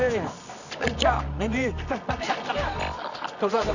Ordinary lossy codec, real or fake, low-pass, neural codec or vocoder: none; fake; 7.2 kHz; codec, 24 kHz, 0.9 kbps, WavTokenizer, medium music audio release